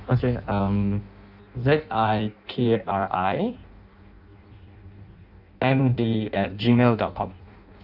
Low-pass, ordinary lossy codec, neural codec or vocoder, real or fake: 5.4 kHz; none; codec, 16 kHz in and 24 kHz out, 0.6 kbps, FireRedTTS-2 codec; fake